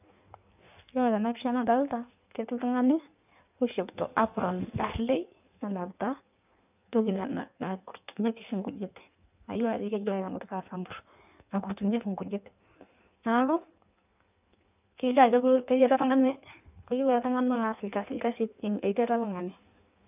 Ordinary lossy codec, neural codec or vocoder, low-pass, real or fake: none; codec, 16 kHz in and 24 kHz out, 1.1 kbps, FireRedTTS-2 codec; 3.6 kHz; fake